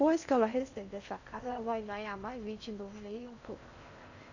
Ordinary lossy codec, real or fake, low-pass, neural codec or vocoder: none; fake; 7.2 kHz; codec, 16 kHz in and 24 kHz out, 0.6 kbps, FocalCodec, streaming, 2048 codes